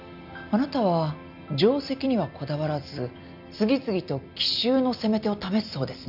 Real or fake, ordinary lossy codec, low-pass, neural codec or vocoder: real; none; 5.4 kHz; none